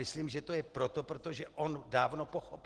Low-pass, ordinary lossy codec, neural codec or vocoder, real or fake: 10.8 kHz; Opus, 16 kbps; none; real